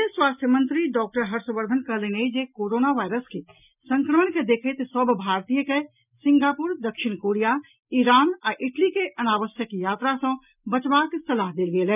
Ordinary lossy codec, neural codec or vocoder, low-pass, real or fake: none; none; 3.6 kHz; real